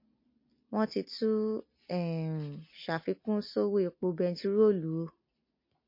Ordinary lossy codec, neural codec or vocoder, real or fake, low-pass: MP3, 32 kbps; none; real; 5.4 kHz